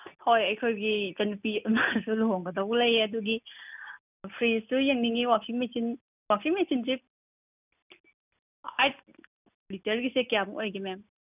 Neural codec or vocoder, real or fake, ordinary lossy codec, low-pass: none; real; none; 3.6 kHz